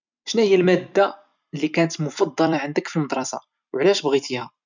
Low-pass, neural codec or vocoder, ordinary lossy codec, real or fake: 7.2 kHz; vocoder, 44.1 kHz, 128 mel bands every 256 samples, BigVGAN v2; none; fake